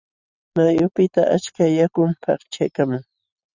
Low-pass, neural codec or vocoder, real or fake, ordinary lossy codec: 7.2 kHz; vocoder, 44.1 kHz, 128 mel bands every 256 samples, BigVGAN v2; fake; Opus, 64 kbps